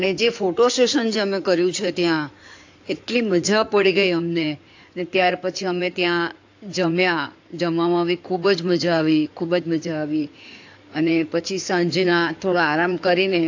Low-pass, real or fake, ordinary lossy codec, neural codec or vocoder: 7.2 kHz; fake; AAC, 48 kbps; codec, 16 kHz in and 24 kHz out, 2.2 kbps, FireRedTTS-2 codec